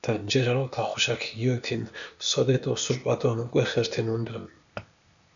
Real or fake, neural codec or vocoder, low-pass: fake; codec, 16 kHz, 0.8 kbps, ZipCodec; 7.2 kHz